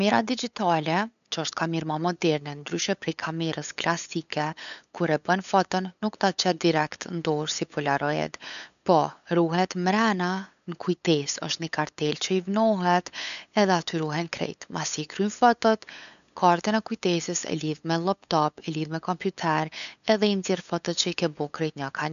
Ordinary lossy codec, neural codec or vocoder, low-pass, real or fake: none; codec, 16 kHz, 8 kbps, FunCodec, trained on LibriTTS, 25 frames a second; 7.2 kHz; fake